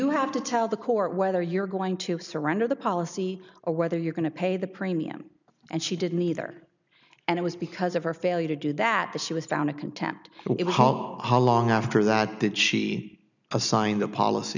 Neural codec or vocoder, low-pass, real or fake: none; 7.2 kHz; real